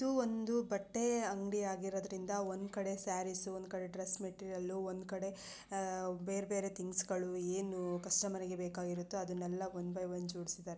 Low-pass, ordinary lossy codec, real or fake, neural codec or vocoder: none; none; real; none